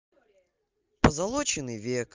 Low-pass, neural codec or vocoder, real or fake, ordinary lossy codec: 7.2 kHz; none; real; Opus, 24 kbps